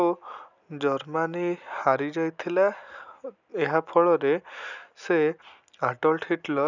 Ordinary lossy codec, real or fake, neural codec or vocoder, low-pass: none; real; none; 7.2 kHz